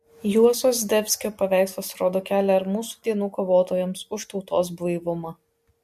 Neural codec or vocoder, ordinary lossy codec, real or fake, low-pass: none; MP3, 64 kbps; real; 14.4 kHz